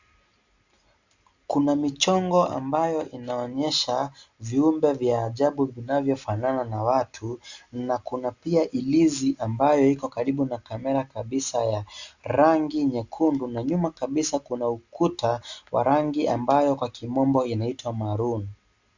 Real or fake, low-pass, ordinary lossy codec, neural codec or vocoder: real; 7.2 kHz; Opus, 64 kbps; none